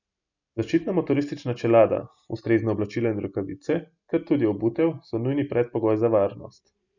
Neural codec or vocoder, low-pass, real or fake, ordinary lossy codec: none; 7.2 kHz; real; none